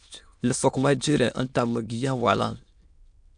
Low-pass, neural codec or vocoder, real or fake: 9.9 kHz; autoencoder, 22.05 kHz, a latent of 192 numbers a frame, VITS, trained on many speakers; fake